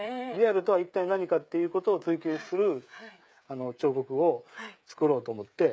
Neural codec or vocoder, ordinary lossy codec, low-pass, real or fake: codec, 16 kHz, 16 kbps, FreqCodec, smaller model; none; none; fake